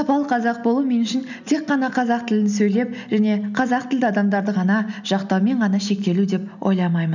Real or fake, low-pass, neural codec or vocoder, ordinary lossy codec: real; 7.2 kHz; none; none